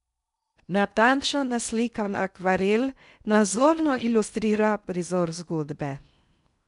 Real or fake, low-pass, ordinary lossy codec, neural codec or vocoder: fake; 10.8 kHz; none; codec, 16 kHz in and 24 kHz out, 0.6 kbps, FocalCodec, streaming, 2048 codes